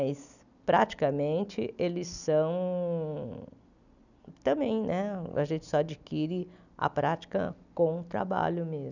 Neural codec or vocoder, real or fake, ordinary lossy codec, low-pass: none; real; none; 7.2 kHz